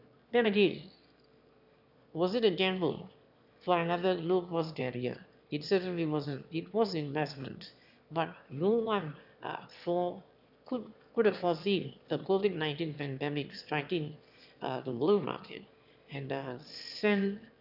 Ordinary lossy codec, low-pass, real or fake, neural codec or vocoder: none; 5.4 kHz; fake; autoencoder, 22.05 kHz, a latent of 192 numbers a frame, VITS, trained on one speaker